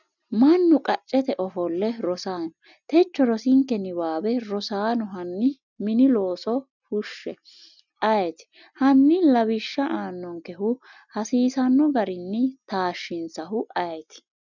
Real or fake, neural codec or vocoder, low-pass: real; none; 7.2 kHz